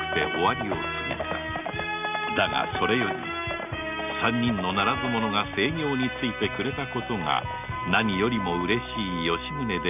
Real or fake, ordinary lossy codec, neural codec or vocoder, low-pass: real; none; none; 3.6 kHz